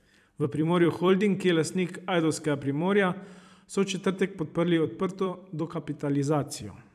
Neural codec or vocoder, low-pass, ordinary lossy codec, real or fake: vocoder, 44.1 kHz, 128 mel bands every 256 samples, BigVGAN v2; 14.4 kHz; none; fake